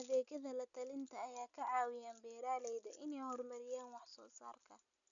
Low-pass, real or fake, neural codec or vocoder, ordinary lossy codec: 7.2 kHz; real; none; none